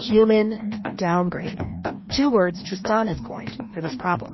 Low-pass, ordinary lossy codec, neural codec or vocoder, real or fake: 7.2 kHz; MP3, 24 kbps; codec, 16 kHz, 1 kbps, FreqCodec, larger model; fake